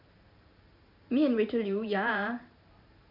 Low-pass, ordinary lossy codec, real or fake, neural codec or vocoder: 5.4 kHz; none; fake; vocoder, 44.1 kHz, 128 mel bands every 512 samples, BigVGAN v2